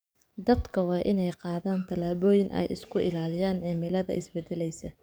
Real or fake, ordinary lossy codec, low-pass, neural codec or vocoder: fake; none; none; codec, 44.1 kHz, 7.8 kbps, DAC